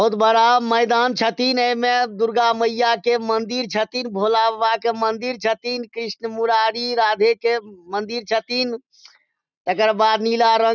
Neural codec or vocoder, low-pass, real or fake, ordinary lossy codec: none; 7.2 kHz; real; none